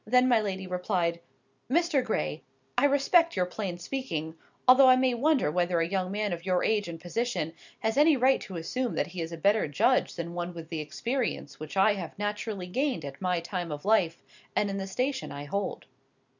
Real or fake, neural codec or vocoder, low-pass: real; none; 7.2 kHz